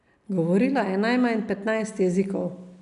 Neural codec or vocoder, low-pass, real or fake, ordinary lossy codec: none; 10.8 kHz; real; none